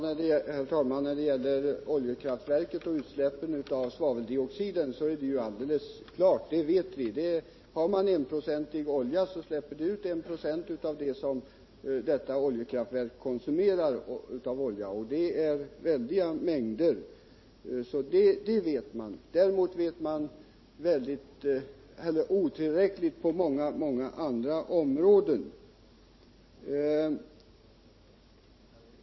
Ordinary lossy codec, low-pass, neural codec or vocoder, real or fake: MP3, 24 kbps; 7.2 kHz; none; real